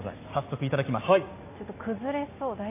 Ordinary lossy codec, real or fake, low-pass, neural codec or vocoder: AAC, 24 kbps; real; 3.6 kHz; none